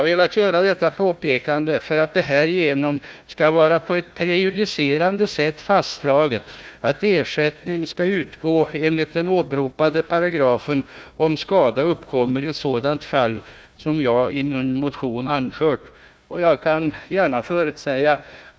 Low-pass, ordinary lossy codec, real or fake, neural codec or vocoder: none; none; fake; codec, 16 kHz, 1 kbps, FunCodec, trained on Chinese and English, 50 frames a second